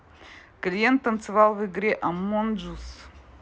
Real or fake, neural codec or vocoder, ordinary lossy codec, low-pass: real; none; none; none